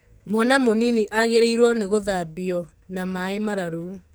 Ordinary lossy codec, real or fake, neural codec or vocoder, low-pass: none; fake; codec, 44.1 kHz, 2.6 kbps, SNAC; none